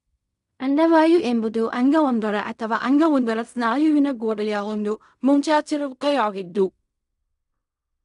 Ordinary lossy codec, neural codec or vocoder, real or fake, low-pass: none; codec, 16 kHz in and 24 kHz out, 0.4 kbps, LongCat-Audio-Codec, fine tuned four codebook decoder; fake; 10.8 kHz